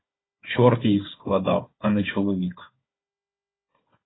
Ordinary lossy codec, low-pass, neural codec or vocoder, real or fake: AAC, 16 kbps; 7.2 kHz; codec, 16 kHz, 4 kbps, FunCodec, trained on Chinese and English, 50 frames a second; fake